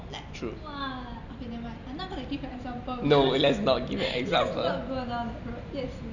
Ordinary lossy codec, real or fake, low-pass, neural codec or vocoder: none; real; 7.2 kHz; none